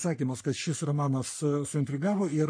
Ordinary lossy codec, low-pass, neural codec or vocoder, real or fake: MP3, 48 kbps; 9.9 kHz; codec, 44.1 kHz, 3.4 kbps, Pupu-Codec; fake